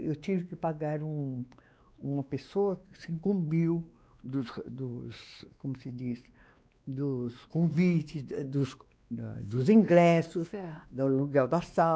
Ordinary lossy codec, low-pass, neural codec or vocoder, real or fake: none; none; codec, 16 kHz, 2 kbps, X-Codec, WavLM features, trained on Multilingual LibriSpeech; fake